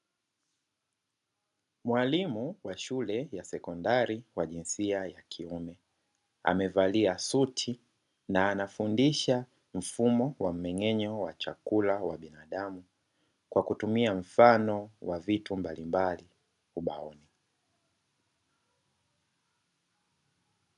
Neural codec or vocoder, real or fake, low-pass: none; real; 10.8 kHz